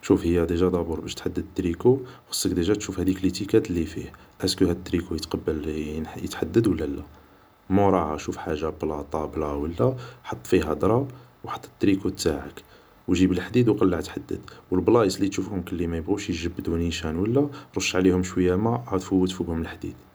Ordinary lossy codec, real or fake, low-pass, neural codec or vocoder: none; real; none; none